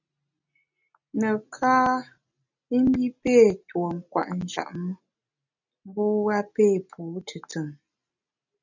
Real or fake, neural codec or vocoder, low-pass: real; none; 7.2 kHz